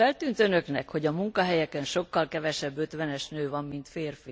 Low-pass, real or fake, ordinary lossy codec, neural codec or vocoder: none; real; none; none